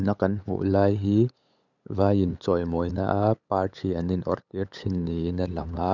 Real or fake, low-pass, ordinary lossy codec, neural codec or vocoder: fake; 7.2 kHz; none; codec, 16 kHz, 8 kbps, FunCodec, trained on LibriTTS, 25 frames a second